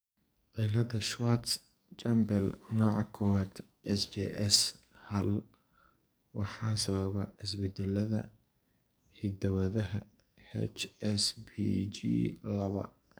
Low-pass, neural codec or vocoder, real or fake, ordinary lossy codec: none; codec, 44.1 kHz, 2.6 kbps, SNAC; fake; none